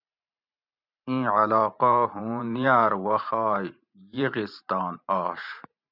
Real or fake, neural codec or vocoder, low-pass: fake; vocoder, 44.1 kHz, 128 mel bands every 512 samples, BigVGAN v2; 5.4 kHz